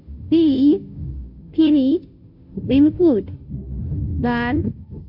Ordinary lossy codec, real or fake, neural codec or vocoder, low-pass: none; fake; codec, 16 kHz, 0.5 kbps, FunCodec, trained on Chinese and English, 25 frames a second; 5.4 kHz